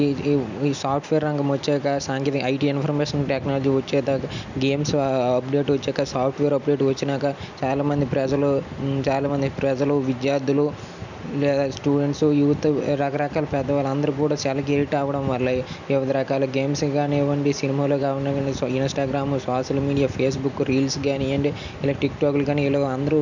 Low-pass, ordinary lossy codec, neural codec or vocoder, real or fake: 7.2 kHz; none; none; real